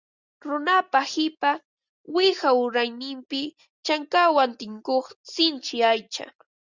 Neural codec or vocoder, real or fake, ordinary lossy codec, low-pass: none; real; Opus, 64 kbps; 7.2 kHz